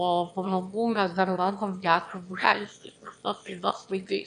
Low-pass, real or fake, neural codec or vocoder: 9.9 kHz; fake; autoencoder, 22.05 kHz, a latent of 192 numbers a frame, VITS, trained on one speaker